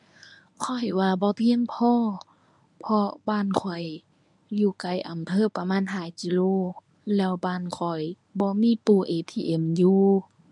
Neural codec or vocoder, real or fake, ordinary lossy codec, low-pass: codec, 24 kHz, 0.9 kbps, WavTokenizer, medium speech release version 1; fake; none; 10.8 kHz